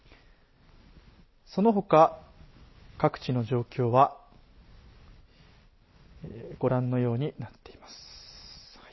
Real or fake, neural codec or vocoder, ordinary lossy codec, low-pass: real; none; MP3, 24 kbps; 7.2 kHz